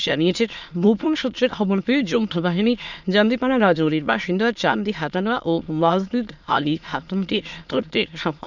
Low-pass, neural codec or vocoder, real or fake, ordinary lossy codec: 7.2 kHz; autoencoder, 22.05 kHz, a latent of 192 numbers a frame, VITS, trained on many speakers; fake; none